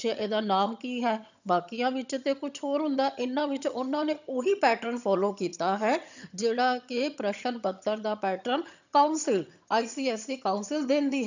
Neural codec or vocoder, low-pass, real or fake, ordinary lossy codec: vocoder, 22.05 kHz, 80 mel bands, HiFi-GAN; 7.2 kHz; fake; none